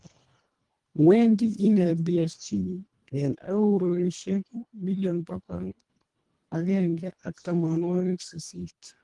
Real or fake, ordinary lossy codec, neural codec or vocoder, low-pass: fake; Opus, 24 kbps; codec, 24 kHz, 1.5 kbps, HILCodec; 10.8 kHz